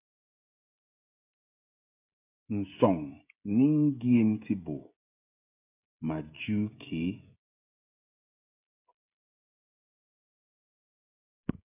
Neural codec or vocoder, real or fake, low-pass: codec, 16 kHz, 16 kbps, FreqCodec, smaller model; fake; 3.6 kHz